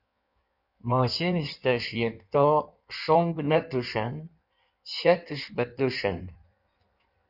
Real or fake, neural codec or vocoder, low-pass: fake; codec, 16 kHz in and 24 kHz out, 1.1 kbps, FireRedTTS-2 codec; 5.4 kHz